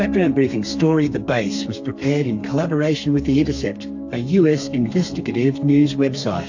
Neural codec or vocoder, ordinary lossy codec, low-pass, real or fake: codec, 32 kHz, 1.9 kbps, SNAC; AAC, 48 kbps; 7.2 kHz; fake